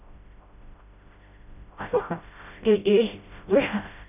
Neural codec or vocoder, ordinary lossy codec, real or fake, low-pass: codec, 16 kHz, 0.5 kbps, FreqCodec, smaller model; none; fake; 3.6 kHz